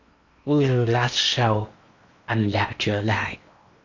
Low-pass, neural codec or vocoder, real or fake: 7.2 kHz; codec, 16 kHz in and 24 kHz out, 0.8 kbps, FocalCodec, streaming, 65536 codes; fake